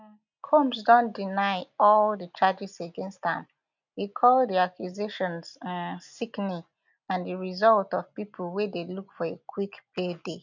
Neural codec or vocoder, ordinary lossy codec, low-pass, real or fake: none; none; 7.2 kHz; real